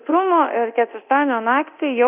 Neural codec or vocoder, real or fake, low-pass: codec, 24 kHz, 0.9 kbps, DualCodec; fake; 3.6 kHz